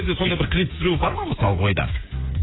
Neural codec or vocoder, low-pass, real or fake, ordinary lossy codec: codec, 16 kHz, 8 kbps, FreqCodec, smaller model; 7.2 kHz; fake; AAC, 16 kbps